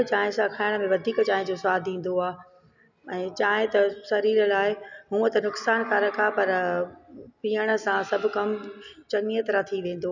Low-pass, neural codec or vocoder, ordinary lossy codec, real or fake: 7.2 kHz; none; none; real